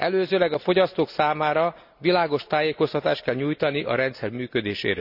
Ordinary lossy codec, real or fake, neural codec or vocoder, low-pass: none; real; none; 5.4 kHz